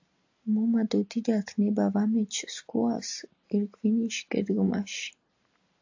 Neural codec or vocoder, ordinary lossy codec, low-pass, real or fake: none; AAC, 48 kbps; 7.2 kHz; real